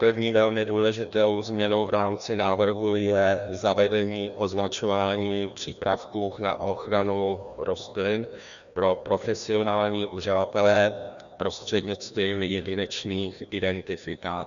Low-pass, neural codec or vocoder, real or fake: 7.2 kHz; codec, 16 kHz, 1 kbps, FreqCodec, larger model; fake